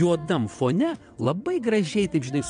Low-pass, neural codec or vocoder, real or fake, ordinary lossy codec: 9.9 kHz; vocoder, 22.05 kHz, 80 mel bands, WaveNeXt; fake; MP3, 64 kbps